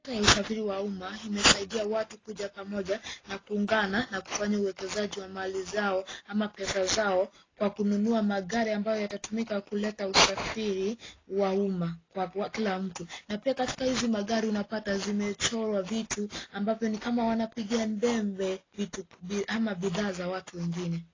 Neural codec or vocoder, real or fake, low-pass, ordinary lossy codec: none; real; 7.2 kHz; AAC, 32 kbps